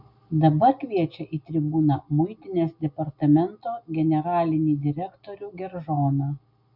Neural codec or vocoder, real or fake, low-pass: none; real; 5.4 kHz